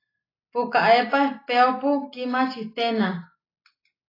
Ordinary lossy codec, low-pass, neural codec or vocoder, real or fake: AAC, 24 kbps; 5.4 kHz; none; real